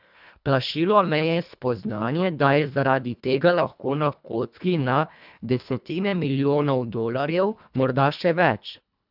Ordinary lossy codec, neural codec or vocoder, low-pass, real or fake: none; codec, 24 kHz, 1.5 kbps, HILCodec; 5.4 kHz; fake